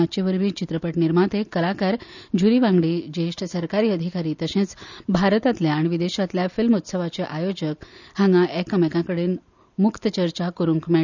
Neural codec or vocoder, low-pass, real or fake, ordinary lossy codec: none; 7.2 kHz; real; none